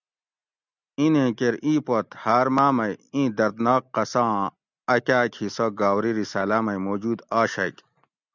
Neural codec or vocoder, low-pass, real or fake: none; 7.2 kHz; real